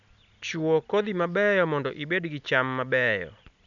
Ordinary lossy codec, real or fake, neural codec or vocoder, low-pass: none; real; none; 7.2 kHz